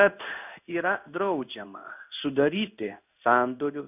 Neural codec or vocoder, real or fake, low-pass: codec, 16 kHz in and 24 kHz out, 1 kbps, XY-Tokenizer; fake; 3.6 kHz